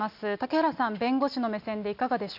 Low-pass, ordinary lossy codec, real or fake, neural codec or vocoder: 5.4 kHz; none; real; none